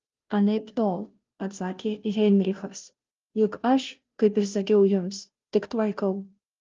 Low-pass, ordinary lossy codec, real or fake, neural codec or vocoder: 7.2 kHz; Opus, 32 kbps; fake; codec, 16 kHz, 0.5 kbps, FunCodec, trained on Chinese and English, 25 frames a second